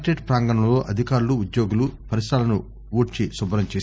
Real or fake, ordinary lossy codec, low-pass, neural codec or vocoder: real; none; none; none